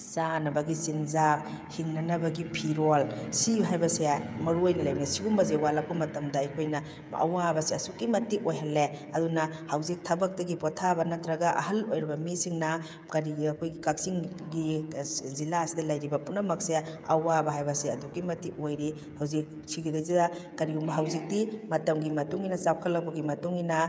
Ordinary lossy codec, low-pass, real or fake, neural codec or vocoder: none; none; fake; codec, 16 kHz, 16 kbps, FreqCodec, smaller model